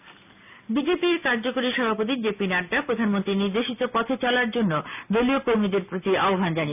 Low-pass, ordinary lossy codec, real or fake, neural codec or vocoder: 3.6 kHz; none; real; none